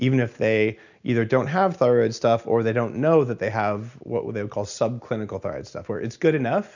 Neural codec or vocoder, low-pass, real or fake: none; 7.2 kHz; real